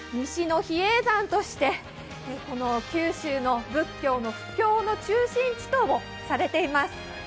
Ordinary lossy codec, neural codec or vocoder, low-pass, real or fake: none; none; none; real